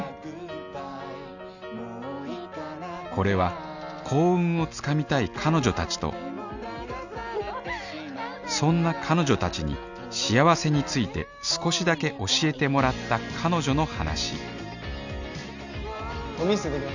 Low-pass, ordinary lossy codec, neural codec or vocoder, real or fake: 7.2 kHz; none; none; real